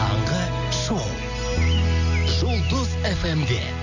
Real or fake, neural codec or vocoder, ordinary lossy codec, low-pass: real; none; none; 7.2 kHz